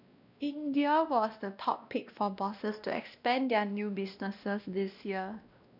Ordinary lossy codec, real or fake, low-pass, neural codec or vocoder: none; fake; 5.4 kHz; codec, 16 kHz, 1 kbps, X-Codec, WavLM features, trained on Multilingual LibriSpeech